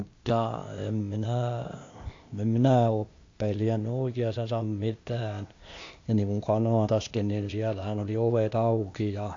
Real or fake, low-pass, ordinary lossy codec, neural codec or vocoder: fake; 7.2 kHz; AAC, 48 kbps; codec, 16 kHz, 0.8 kbps, ZipCodec